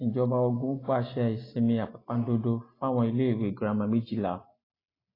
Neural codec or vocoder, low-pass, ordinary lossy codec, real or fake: none; 5.4 kHz; AAC, 24 kbps; real